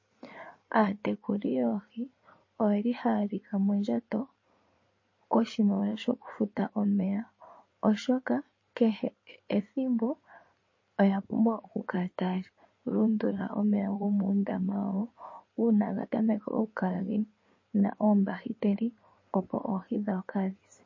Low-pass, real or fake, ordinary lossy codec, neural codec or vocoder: 7.2 kHz; fake; MP3, 32 kbps; codec, 16 kHz in and 24 kHz out, 2.2 kbps, FireRedTTS-2 codec